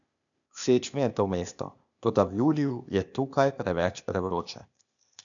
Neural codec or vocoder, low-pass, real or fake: codec, 16 kHz, 0.8 kbps, ZipCodec; 7.2 kHz; fake